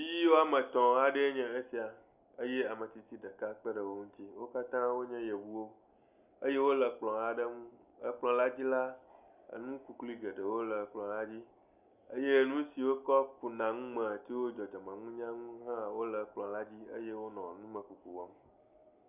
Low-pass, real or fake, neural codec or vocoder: 3.6 kHz; real; none